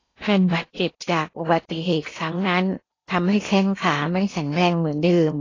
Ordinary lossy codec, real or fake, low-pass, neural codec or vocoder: AAC, 32 kbps; fake; 7.2 kHz; codec, 16 kHz in and 24 kHz out, 0.8 kbps, FocalCodec, streaming, 65536 codes